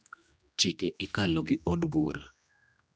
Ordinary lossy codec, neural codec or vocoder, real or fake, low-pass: none; codec, 16 kHz, 1 kbps, X-Codec, HuBERT features, trained on general audio; fake; none